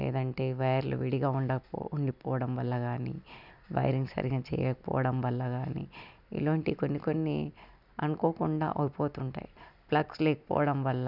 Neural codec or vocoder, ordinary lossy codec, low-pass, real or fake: none; none; 5.4 kHz; real